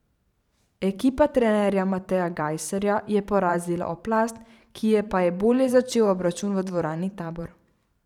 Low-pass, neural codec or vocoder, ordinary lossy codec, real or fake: 19.8 kHz; vocoder, 44.1 kHz, 128 mel bands every 512 samples, BigVGAN v2; none; fake